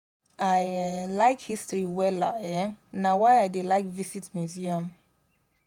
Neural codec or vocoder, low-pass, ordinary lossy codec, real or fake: vocoder, 48 kHz, 128 mel bands, Vocos; 19.8 kHz; none; fake